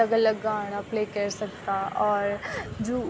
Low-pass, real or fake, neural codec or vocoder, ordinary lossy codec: none; real; none; none